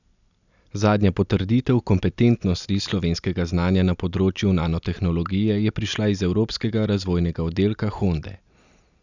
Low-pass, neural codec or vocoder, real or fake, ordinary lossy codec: 7.2 kHz; none; real; none